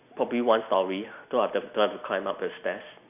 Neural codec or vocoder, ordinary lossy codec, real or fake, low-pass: none; none; real; 3.6 kHz